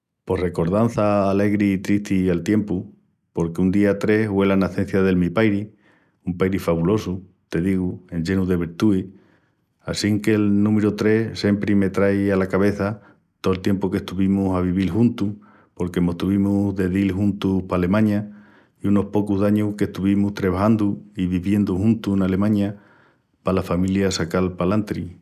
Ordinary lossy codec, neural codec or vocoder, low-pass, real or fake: none; none; 14.4 kHz; real